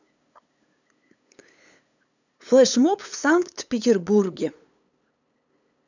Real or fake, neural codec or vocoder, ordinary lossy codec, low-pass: fake; codec, 16 kHz, 8 kbps, FunCodec, trained on LibriTTS, 25 frames a second; none; 7.2 kHz